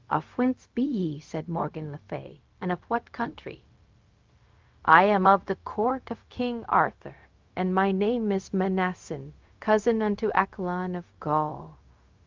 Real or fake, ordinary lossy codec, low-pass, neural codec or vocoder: fake; Opus, 32 kbps; 7.2 kHz; codec, 16 kHz, 0.4 kbps, LongCat-Audio-Codec